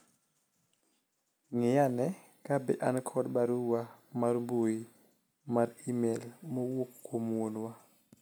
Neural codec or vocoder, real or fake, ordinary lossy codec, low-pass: none; real; none; none